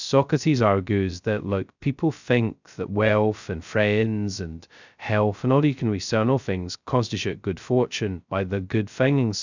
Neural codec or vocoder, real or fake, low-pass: codec, 16 kHz, 0.2 kbps, FocalCodec; fake; 7.2 kHz